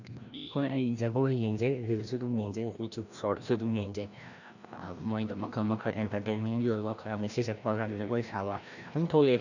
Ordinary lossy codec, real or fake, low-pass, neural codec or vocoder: none; fake; 7.2 kHz; codec, 16 kHz, 1 kbps, FreqCodec, larger model